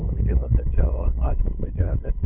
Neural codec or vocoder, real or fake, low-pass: codec, 16 kHz, 2 kbps, FunCodec, trained on LibriTTS, 25 frames a second; fake; 3.6 kHz